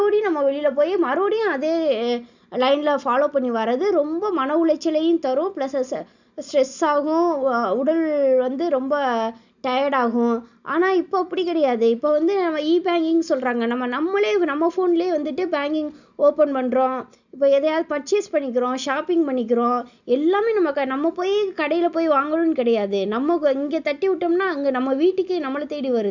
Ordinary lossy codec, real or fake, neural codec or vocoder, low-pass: none; real; none; 7.2 kHz